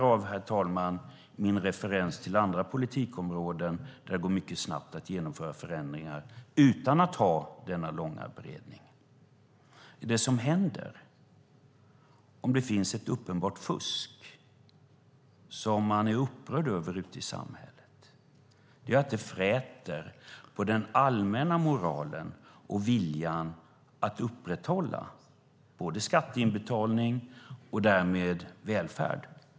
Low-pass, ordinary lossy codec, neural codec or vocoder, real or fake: none; none; none; real